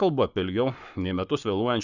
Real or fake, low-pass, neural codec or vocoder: fake; 7.2 kHz; codec, 44.1 kHz, 7.8 kbps, Pupu-Codec